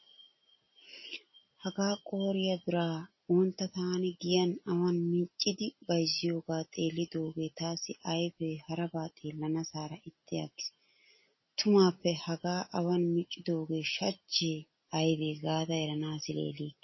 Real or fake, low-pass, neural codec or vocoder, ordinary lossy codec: real; 7.2 kHz; none; MP3, 24 kbps